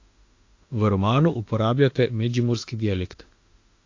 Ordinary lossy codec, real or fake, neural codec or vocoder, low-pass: AAC, 48 kbps; fake; autoencoder, 48 kHz, 32 numbers a frame, DAC-VAE, trained on Japanese speech; 7.2 kHz